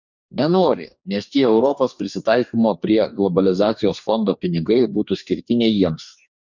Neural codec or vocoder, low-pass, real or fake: codec, 44.1 kHz, 2.6 kbps, DAC; 7.2 kHz; fake